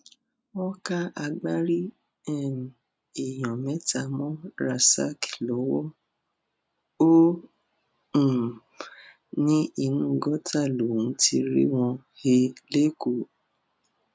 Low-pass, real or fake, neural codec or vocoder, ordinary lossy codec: none; real; none; none